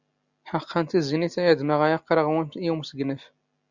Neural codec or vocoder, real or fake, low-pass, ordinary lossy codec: none; real; 7.2 kHz; Opus, 64 kbps